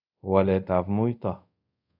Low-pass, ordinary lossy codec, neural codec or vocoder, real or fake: 5.4 kHz; none; codec, 24 kHz, 0.5 kbps, DualCodec; fake